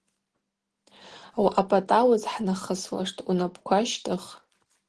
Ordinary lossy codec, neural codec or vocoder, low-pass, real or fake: Opus, 16 kbps; none; 10.8 kHz; real